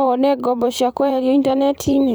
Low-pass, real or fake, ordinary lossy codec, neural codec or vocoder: none; fake; none; vocoder, 44.1 kHz, 128 mel bands every 512 samples, BigVGAN v2